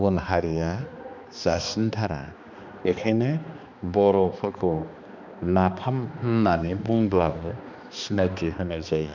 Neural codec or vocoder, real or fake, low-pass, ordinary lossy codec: codec, 16 kHz, 2 kbps, X-Codec, HuBERT features, trained on balanced general audio; fake; 7.2 kHz; none